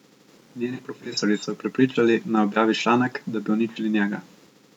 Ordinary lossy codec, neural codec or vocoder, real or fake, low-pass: none; none; real; 19.8 kHz